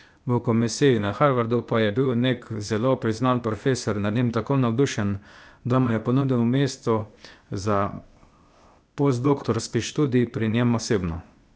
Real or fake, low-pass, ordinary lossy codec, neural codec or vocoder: fake; none; none; codec, 16 kHz, 0.8 kbps, ZipCodec